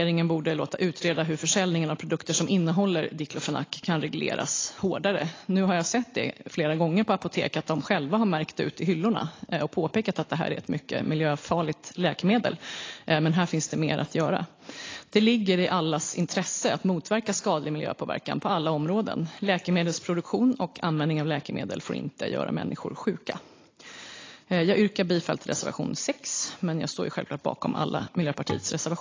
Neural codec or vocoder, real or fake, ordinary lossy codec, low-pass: none; real; AAC, 32 kbps; 7.2 kHz